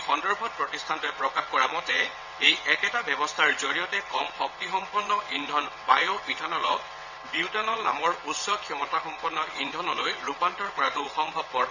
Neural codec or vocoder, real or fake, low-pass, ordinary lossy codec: vocoder, 22.05 kHz, 80 mel bands, WaveNeXt; fake; 7.2 kHz; none